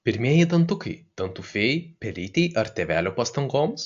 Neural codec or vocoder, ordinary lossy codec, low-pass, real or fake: none; AAC, 64 kbps; 7.2 kHz; real